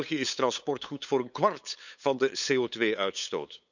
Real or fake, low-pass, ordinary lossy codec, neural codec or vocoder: fake; 7.2 kHz; none; codec, 16 kHz, 8 kbps, FunCodec, trained on LibriTTS, 25 frames a second